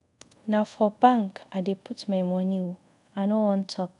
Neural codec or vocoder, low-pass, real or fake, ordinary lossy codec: codec, 24 kHz, 0.5 kbps, DualCodec; 10.8 kHz; fake; none